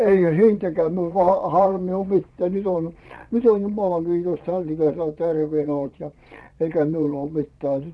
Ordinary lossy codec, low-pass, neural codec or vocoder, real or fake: none; none; vocoder, 22.05 kHz, 80 mel bands, Vocos; fake